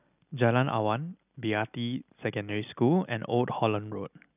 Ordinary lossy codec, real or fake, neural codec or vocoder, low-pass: none; real; none; 3.6 kHz